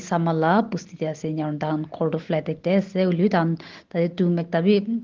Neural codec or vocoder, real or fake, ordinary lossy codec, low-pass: none; real; Opus, 16 kbps; 7.2 kHz